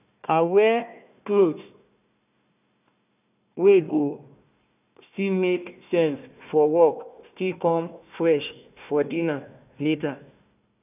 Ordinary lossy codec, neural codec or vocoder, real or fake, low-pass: none; codec, 16 kHz, 1 kbps, FunCodec, trained on Chinese and English, 50 frames a second; fake; 3.6 kHz